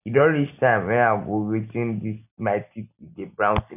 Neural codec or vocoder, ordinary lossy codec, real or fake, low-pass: none; none; real; 3.6 kHz